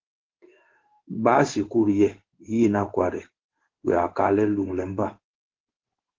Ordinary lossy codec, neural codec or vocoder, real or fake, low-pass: Opus, 16 kbps; codec, 16 kHz in and 24 kHz out, 1 kbps, XY-Tokenizer; fake; 7.2 kHz